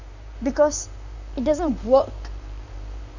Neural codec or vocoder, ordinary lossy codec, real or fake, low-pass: vocoder, 44.1 kHz, 128 mel bands, Pupu-Vocoder; none; fake; 7.2 kHz